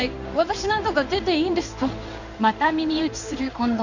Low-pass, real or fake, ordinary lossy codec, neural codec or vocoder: 7.2 kHz; fake; none; codec, 16 kHz in and 24 kHz out, 1 kbps, XY-Tokenizer